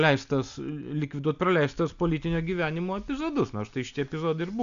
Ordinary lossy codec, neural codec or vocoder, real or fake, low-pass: AAC, 96 kbps; none; real; 7.2 kHz